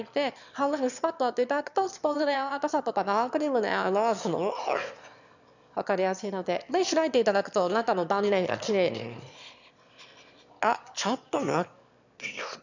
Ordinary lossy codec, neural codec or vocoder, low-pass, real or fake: none; autoencoder, 22.05 kHz, a latent of 192 numbers a frame, VITS, trained on one speaker; 7.2 kHz; fake